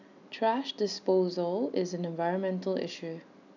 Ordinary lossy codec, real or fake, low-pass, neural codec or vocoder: none; real; 7.2 kHz; none